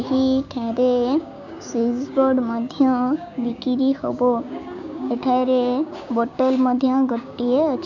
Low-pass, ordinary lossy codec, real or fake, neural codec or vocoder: 7.2 kHz; none; fake; codec, 16 kHz, 6 kbps, DAC